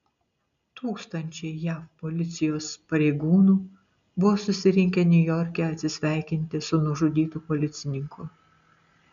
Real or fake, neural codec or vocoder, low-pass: real; none; 7.2 kHz